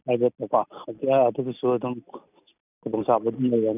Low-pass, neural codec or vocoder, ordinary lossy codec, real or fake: 3.6 kHz; none; none; real